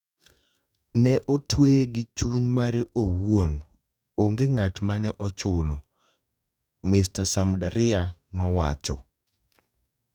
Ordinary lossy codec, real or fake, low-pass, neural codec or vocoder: none; fake; 19.8 kHz; codec, 44.1 kHz, 2.6 kbps, DAC